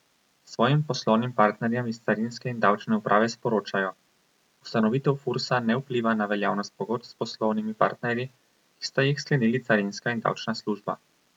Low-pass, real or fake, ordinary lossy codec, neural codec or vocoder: 19.8 kHz; real; none; none